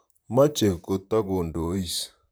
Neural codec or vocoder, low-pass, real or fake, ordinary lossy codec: vocoder, 44.1 kHz, 128 mel bands, Pupu-Vocoder; none; fake; none